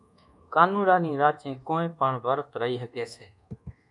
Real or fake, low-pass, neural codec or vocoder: fake; 10.8 kHz; codec, 24 kHz, 1.2 kbps, DualCodec